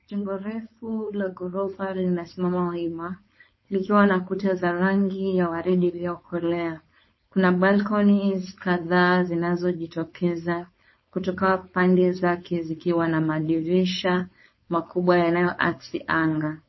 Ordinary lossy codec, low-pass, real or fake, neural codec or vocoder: MP3, 24 kbps; 7.2 kHz; fake; codec, 16 kHz, 4.8 kbps, FACodec